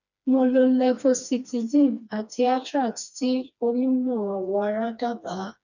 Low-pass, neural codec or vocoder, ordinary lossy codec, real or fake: 7.2 kHz; codec, 16 kHz, 2 kbps, FreqCodec, smaller model; none; fake